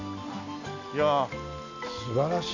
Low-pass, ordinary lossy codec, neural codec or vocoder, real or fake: 7.2 kHz; none; none; real